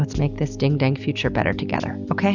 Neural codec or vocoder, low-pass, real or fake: none; 7.2 kHz; real